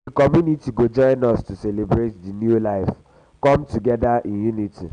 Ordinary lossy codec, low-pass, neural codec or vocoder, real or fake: none; 9.9 kHz; none; real